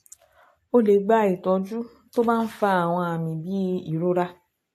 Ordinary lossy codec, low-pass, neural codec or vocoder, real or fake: AAC, 64 kbps; 14.4 kHz; none; real